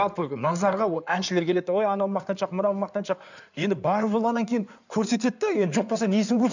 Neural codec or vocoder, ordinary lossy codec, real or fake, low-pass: codec, 16 kHz in and 24 kHz out, 2.2 kbps, FireRedTTS-2 codec; none; fake; 7.2 kHz